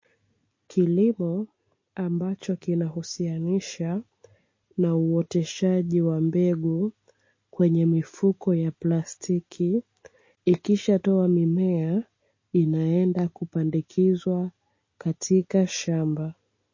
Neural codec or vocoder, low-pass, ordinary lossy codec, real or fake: none; 7.2 kHz; MP3, 32 kbps; real